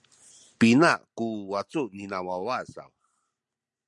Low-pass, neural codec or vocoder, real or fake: 10.8 kHz; none; real